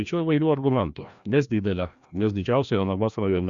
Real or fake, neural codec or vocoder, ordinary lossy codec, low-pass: fake; codec, 16 kHz, 1 kbps, FreqCodec, larger model; Opus, 64 kbps; 7.2 kHz